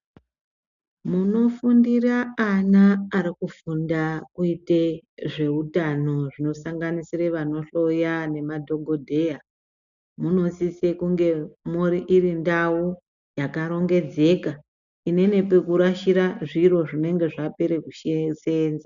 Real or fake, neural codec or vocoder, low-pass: real; none; 7.2 kHz